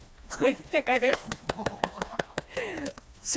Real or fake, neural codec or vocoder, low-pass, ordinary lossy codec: fake; codec, 16 kHz, 2 kbps, FreqCodec, smaller model; none; none